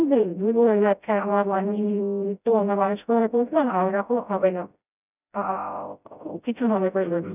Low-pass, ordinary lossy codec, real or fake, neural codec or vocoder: 3.6 kHz; none; fake; codec, 16 kHz, 0.5 kbps, FreqCodec, smaller model